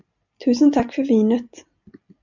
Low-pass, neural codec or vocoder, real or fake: 7.2 kHz; none; real